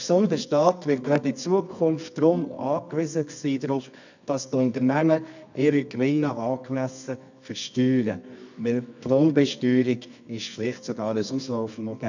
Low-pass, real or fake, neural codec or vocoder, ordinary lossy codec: 7.2 kHz; fake; codec, 24 kHz, 0.9 kbps, WavTokenizer, medium music audio release; none